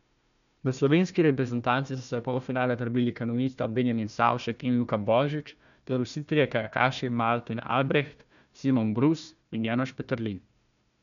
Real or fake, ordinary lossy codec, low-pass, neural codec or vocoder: fake; none; 7.2 kHz; codec, 16 kHz, 1 kbps, FunCodec, trained on Chinese and English, 50 frames a second